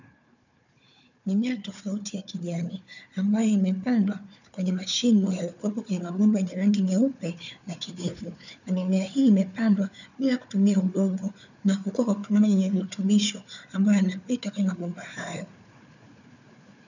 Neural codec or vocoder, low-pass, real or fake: codec, 16 kHz, 4 kbps, FunCodec, trained on Chinese and English, 50 frames a second; 7.2 kHz; fake